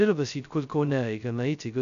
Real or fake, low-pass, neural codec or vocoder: fake; 7.2 kHz; codec, 16 kHz, 0.2 kbps, FocalCodec